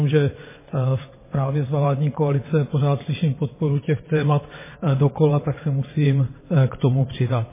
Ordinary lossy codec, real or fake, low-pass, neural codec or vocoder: MP3, 16 kbps; fake; 3.6 kHz; vocoder, 22.05 kHz, 80 mel bands, WaveNeXt